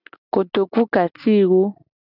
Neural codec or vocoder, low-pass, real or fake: none; 5.4 kHz; real